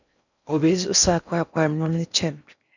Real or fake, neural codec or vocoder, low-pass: fake; codec, 16 kHz in and 24 kHz out, 0.6 kbps, FocalCodec, streaming, 4096 codes; 7.2 kHz